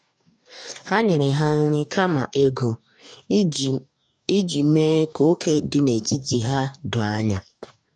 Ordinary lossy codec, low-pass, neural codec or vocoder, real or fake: none; 9.9 kHz; codec, 44.1 kHz, 2.6 kbps, DAC; fake